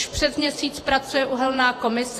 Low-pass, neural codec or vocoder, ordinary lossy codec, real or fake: 14.4 kHz; vocoder, 48 kHz, 128 mel bands, Vocos; AAC, 48 kbps; fake